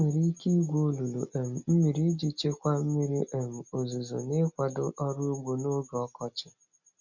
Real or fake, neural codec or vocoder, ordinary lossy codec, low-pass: real; none; none; 7.2 kHz